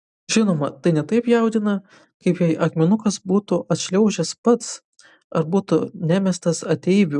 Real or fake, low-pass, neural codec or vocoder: real; 10.8 kHz; none